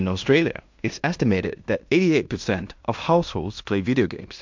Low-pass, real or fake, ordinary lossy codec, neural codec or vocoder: 7.2 kHz; fake; MP3, 64 kbps; codec, 16 kHz in and 24 kHz out, 0.9 kbps, LongCat-Audio-Codec, fine tuned four codebook decoder